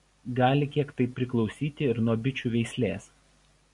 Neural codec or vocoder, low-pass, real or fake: none; 10.8 kHz; real